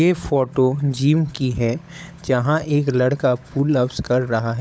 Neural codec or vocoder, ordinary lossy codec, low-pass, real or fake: codec, 16 kHz, 16 kbps, FunCodec, trained on LibriTTS, 50 frames a second; none; none; fake